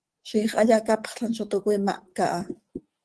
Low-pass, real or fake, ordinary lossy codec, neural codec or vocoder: 10.8 kHz; fake; Opus, 16 kbps; codec, 24 kHz, 3.1 kbps, DualCodec